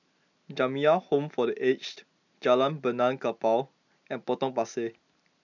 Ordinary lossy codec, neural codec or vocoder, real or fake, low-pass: none; none; real; 7.2 kHz